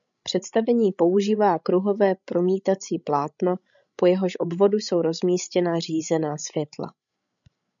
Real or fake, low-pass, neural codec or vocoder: fake; 7.2 kHz; codec, 16 kHz, 16 kbps, FreqCodec, larger model